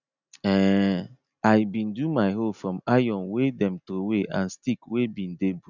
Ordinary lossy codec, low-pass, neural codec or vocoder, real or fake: none; 7.2 kHz; none; real